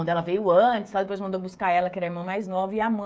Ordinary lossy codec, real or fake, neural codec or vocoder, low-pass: none; fake; codec, 16 kHz, 16 kbps, FreqCodec, smaller model; none